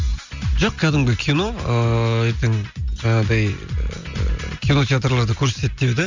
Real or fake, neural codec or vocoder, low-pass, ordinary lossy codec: real; none; 7.2 kHz; Opus, 64 kbps